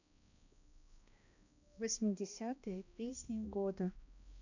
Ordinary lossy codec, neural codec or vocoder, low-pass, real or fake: none; codec, 16 kHz, 1 kbps, X-Codec, HuBERT features, trained on balanced general audio; 7.2 kHz; fake